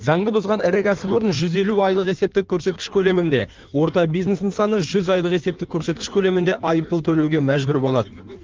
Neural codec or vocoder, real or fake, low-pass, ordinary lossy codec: codec, 16 kHz in and 24 kHz out, 1.1 kbps, FireRedTTS-2 codec; fake; 7.2 kHz; Opus, 32 kbps